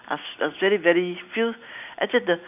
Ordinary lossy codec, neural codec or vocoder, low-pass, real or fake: none; none; 3.6 kHz; real